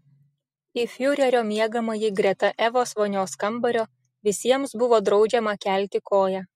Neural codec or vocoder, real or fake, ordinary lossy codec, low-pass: none; real; MP3, 64 kbps; 19.8 kHz